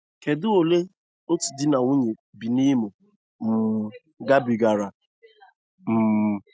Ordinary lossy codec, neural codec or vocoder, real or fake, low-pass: none; none; real; none